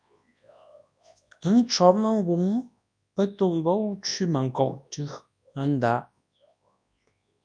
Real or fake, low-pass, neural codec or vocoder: fake; 9.9 kHz; codec, 24 kHz, 0.9 kbps, WavTokenizer, large speech release